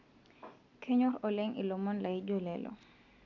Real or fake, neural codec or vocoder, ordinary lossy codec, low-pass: real; none; none; 7.2 kHz